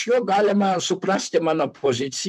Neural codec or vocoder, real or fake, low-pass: vocoder, 44.1 kHz, 128 mel bands, Pupu-Vocoder; fake; 14.4 kHz